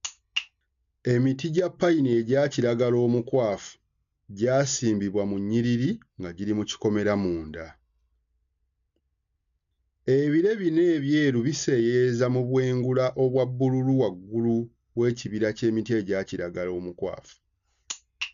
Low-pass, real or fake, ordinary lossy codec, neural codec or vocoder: 7.2 kHz; real; none; none